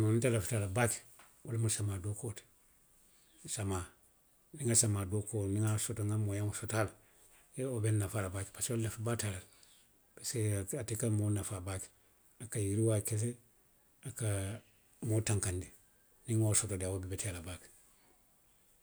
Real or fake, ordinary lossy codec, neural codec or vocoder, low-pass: real; none; none; none